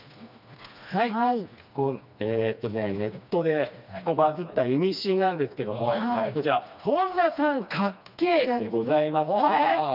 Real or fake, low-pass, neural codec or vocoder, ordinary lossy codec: fake; 5.4 kHz; codec, 16 kHz, 2 kbps, FreqCodec, smaller model; none